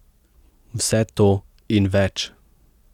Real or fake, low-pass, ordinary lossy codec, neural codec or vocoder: real; 19.8 kHz; none; none